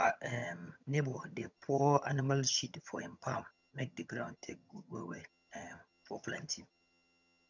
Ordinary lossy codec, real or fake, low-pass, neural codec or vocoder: none; fake; 7.2 kHz; vocoder, 22.05 kHz, 80 mel bands, HiFi-GAN